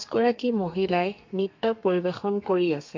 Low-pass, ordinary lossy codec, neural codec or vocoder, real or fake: 7.2 kHz; MP3, 48 kbps; codec, 32 kHz, 1.9 kbps, SNAC; fake